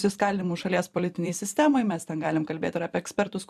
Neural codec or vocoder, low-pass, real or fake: vocoder, 44.1 kHz, 128 mel bands every 256 samples, BigVGAN v2; 14.4 kHz; fake